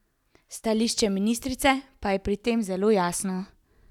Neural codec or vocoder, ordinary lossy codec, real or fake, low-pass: none; none; real; 19.8 kHz